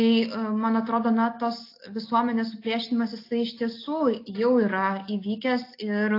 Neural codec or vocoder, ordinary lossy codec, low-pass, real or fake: none; AAC, 32 kbps; 5.4 kHz; real